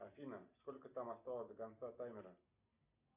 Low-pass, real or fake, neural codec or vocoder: 3.6 kHz; real; none